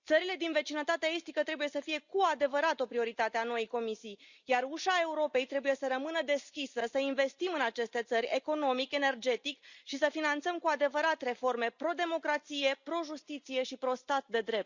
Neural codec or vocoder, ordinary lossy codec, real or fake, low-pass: none; Opus, 64 kbps; real; 7.2 kHz